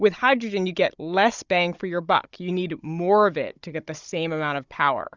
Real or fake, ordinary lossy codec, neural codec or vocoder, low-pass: fake; Opus, 64 kbps; codec, 16 kHz, 16 kbps, FunCodec, trained on Chinese and English, 50 frames a second; 7.2 kHz